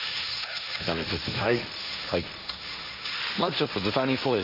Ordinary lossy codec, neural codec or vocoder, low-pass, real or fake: none; codec, 16 kHz, 1.1 kbps, Voila-Tokenizer; 5.4 kHz; fake